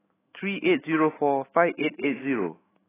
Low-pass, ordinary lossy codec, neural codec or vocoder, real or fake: 3.6 kHz; AAC, 16 kbps; autoencoder, 48 kHz, 128 numbers a frame, DAC-VAE, trained on Japanese speech; fake